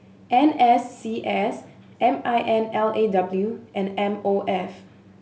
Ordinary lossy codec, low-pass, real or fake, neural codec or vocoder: none; none; real; none